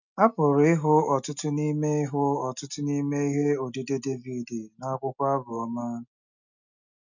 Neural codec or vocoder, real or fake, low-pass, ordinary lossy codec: none; real; 7.2 kHz; none